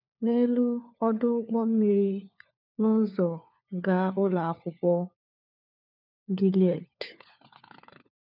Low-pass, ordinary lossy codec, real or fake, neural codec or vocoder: 5.4 kHz; none; fake; codec, 16 kHz, 4 kbps, FunCodec, trained on LibriTTS, 50 frames a second